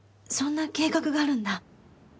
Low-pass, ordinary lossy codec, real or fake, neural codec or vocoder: none; none; real; none